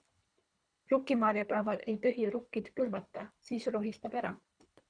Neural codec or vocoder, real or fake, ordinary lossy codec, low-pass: codec, 24 kHz, 3 kbps, HILCodec; fake; Opus, 64 kbps; 9.9 kHz